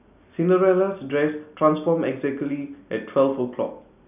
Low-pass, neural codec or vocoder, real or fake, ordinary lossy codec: 3.6 kHz; none; real; none